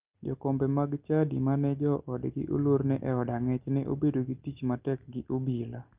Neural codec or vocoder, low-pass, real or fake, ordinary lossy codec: none; 3.6 kHz; real; Opus, 32 kbps